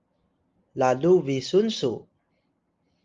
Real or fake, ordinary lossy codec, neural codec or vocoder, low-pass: real; Opus, 32 kbps; none; 7.2 kHz